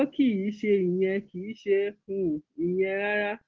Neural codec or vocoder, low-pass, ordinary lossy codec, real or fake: none; 7.2 kHz; Opus, 16 kbps; real